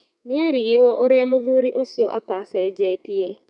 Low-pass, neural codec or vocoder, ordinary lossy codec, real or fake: 10.8 kHz; codec, 32 kHz, 1.9 kbps, SNAC; none; fake